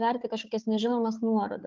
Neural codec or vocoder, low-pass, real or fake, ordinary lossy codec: codec, 16 kHz, 4 kbps, FunCodec, trained on Chinese and English, 50 frames a second; 7.2 kHz; fake; Opus, 32 kbps